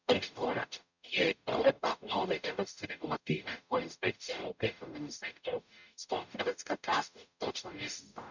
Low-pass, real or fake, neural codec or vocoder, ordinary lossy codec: 7.2 kHz; fake; codec, 44.1 kHz, 0.9 kbps, DAC; none